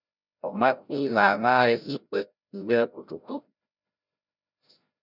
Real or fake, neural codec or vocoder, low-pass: fake; codec, 16 kHz, 0.5 kbps, FreqCodec, larger model; 5.4 kHz